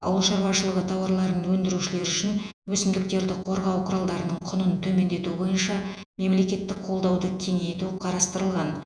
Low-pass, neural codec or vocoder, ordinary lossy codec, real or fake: 9.9 kHz; vocoder, 48 kHz, 128 mel bands, Vocos; none; fake